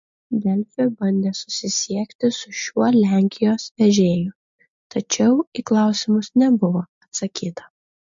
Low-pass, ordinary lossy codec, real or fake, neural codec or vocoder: 7.2 kHz; MP3, 48 kbps; real; none